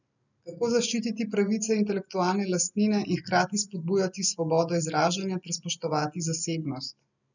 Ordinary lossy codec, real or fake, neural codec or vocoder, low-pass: none; real; none; 7.2 kHz